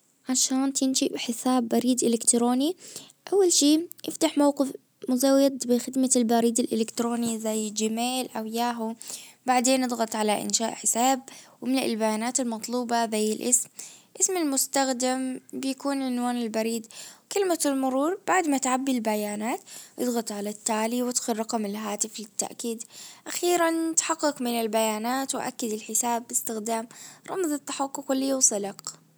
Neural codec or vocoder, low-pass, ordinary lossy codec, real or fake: none; none; none; real